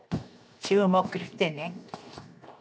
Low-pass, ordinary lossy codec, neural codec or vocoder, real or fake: none; none; codec, 16 kHz, 0.7 kbps, FocalCodec; fake